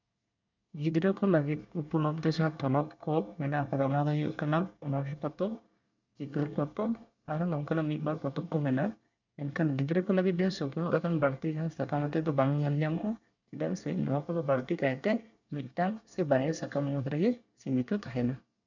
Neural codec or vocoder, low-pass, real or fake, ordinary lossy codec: codec, 24 kHz, 1 kbps, SNAC; 7.2 kHz; fake; none